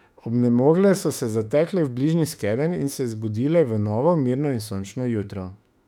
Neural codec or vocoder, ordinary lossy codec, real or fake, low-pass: autoencoder, 48 kHz, 32 numbers a frame, DAC-VAE, trained on Japanese speech; none; fake; 19.8 kHz